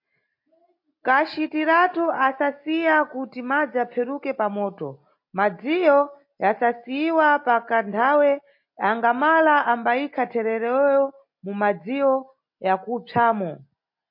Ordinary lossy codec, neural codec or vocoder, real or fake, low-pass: MP3, 32 kbps; none; real; 5.4 kHz